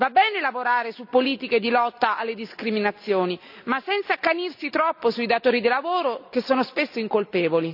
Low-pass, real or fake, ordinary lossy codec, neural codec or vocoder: 5.4 kHz; real; none; none